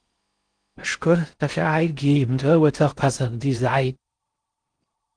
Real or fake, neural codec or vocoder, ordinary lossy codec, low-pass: fake; codec, 16 kHz in and 24 kHz out, 0.6 kbps, FocalCodec, streaming, 4096 codes; Opus, 24 kbps; 9.9 kHz